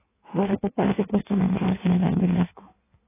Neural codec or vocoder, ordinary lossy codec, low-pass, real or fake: codec, 16 kHz in and 24 kHz out, 1.1 kbps, FireRedTTS-2 codec; AAC, 16 kbps; 3.6 kHz; fake